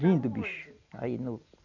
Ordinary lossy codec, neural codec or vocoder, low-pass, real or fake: none; none; 7.2 kHz; real